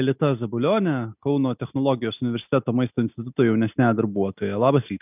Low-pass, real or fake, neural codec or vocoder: 3.6 kHz; real; none